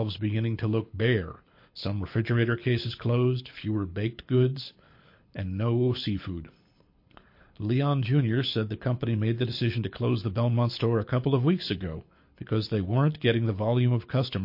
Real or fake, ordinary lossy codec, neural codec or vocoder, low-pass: fake; MP3, 32 kbps; codec, 24 kHz, 6 kbps, HILCodec; 5.4 kHz